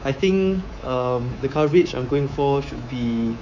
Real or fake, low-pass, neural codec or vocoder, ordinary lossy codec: fake; 7.2 kHz; codec, 24 kHz, 3.1 kbps, DualCodec; none